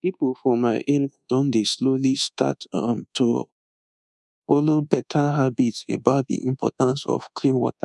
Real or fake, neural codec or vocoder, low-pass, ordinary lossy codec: fake; codec, 24 kHz, 1.2 kbps, DualCodec; 10.8 kHz; none